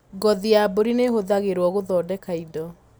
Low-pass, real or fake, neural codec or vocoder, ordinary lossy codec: none; real; none; none